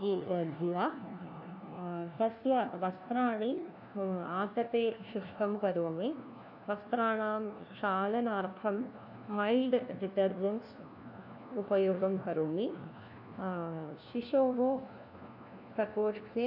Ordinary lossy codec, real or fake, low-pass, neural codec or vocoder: none; fake; 5.4 kHz; codec, 16 kHz, 1 kbps, FunCodec, trained on LibriTTS, 50 frames a second